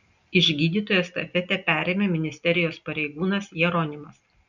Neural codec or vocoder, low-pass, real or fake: none; 7.2 kHz; real